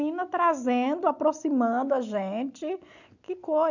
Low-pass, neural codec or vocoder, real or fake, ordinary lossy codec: 7.2 kHz; none; real; none